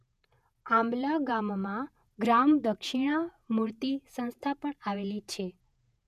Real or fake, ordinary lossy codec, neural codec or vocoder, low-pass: fake; none; vocoder, 48 kHz, 128 mel bands, Vocos; 14.4 kHz